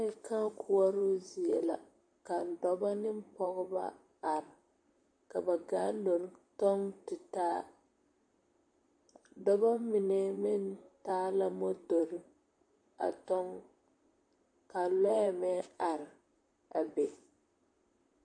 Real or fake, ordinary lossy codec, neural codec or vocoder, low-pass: fake; MP3, 48 kbps; vocoder, 44.1 kHz, 128 mel bands, Pupu-Vocoder; 9.9 kHz